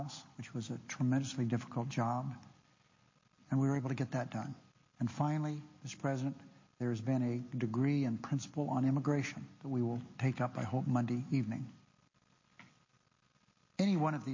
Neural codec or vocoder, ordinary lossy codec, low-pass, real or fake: none; MP3, 32 kbps; 7.2 kHz; real